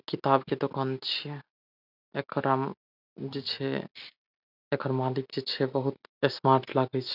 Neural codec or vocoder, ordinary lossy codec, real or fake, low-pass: none; none; real; 5.4 kHz